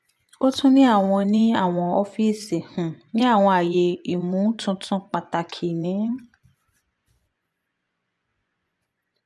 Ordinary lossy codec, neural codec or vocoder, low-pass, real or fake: none; vocoder, 24 kHz, 100 mel bands, Vocos; none; fake